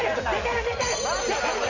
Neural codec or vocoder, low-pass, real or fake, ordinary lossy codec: none; 7.2 kHz; real; MP3, 64 kbps